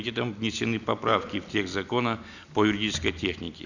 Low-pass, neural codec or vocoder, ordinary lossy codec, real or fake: 7.2 kHz; none; none; real